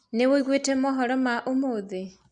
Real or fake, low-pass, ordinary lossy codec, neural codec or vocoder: real; 9.9 kHz; Opus, 64 kbps; none